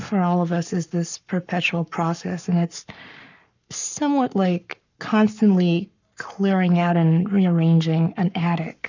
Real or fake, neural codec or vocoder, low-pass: fake; codec, 44.1 kHz, 7.8 kbps, Pupu-Codec; 7.2 kHz